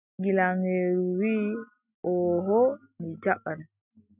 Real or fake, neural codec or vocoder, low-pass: real; none; 3.6 kHz